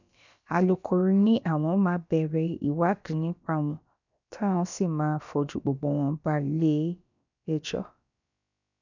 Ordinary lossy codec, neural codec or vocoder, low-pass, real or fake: none; codec, 16 kHz, about 1 kbps, DyCAST, with the encoder's durations; 7.2 kHz; fake